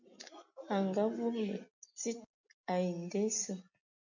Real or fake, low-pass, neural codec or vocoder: real; 7.2 kHz; none